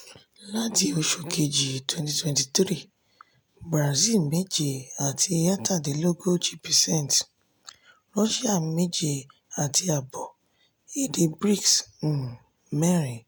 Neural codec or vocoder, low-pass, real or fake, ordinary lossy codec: none; none; real; none